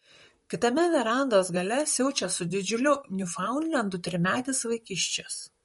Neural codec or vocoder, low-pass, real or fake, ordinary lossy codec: vocoder, 44.1 kHz, 128 mel bands, Pupu-Vocoder; 19.8 kHz; fake; MP3, 48 kbps